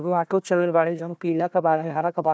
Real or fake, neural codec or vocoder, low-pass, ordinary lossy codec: fake; codec, 16 kHz, 1 kbps, FunCodec, trained on Chinese and English, 50 frames a second; none; none